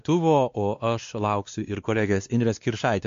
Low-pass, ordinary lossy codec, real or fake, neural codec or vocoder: 7.2 kHz; MP3, 48 kbps; fake; codec, 16 kHz, 2 kbps, X-Codec, WavLM features, trained on Multilingual LibriSpeech